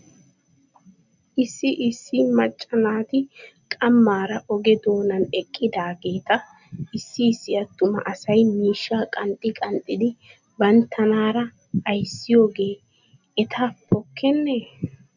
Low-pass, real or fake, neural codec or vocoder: 7.2 kHz; real; none